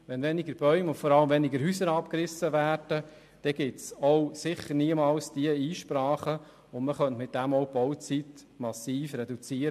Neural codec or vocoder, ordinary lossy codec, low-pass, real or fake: none; MP3, 64 kbps; 14.4 kHz; real